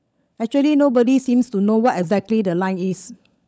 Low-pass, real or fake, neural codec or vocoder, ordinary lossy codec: none; fake; codec, 16 kHz, 16 kbps, FunCodec, trained on LibriTTS, 50 frames a second; none